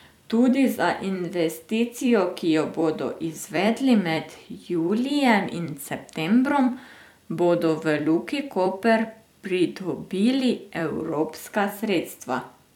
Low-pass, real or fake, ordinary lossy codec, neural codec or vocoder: 19.8 kHz; fake; none; vocoder, 44.1 kHz, 128 mel bands every 256 samples, BigVGAN v2